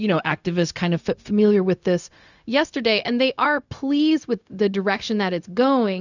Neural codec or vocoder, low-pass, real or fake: codec, 16 kHz, 0.4 kbps, LongCat-Audio-Codec; 7.2 kHz; fake